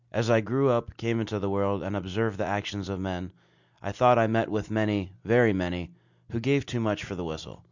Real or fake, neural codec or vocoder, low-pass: real; none; 7.2 kHz